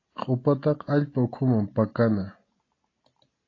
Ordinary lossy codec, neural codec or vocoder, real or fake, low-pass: AAC, 32 kbps; none; real; 7.2 kHz